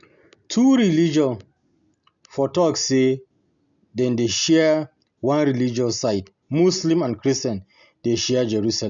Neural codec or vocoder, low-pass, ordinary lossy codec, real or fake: none; 7.2 kHz; none; real